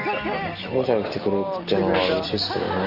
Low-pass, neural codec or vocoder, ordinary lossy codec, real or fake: 5.4 kHz; none; Opus, 24 kbps; real